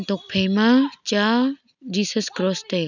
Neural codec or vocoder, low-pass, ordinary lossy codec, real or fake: none; 7.2 kHz; none; real